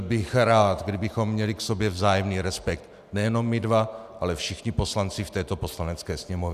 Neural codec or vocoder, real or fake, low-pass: none; real; 14.4 kHz